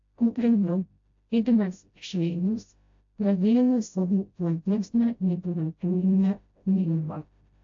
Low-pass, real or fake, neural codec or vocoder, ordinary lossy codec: 7.2 kHz; fake; codec, 16 kHz, 0.5 kbps, FreqCodec, smaller model; AAC, 48 kbps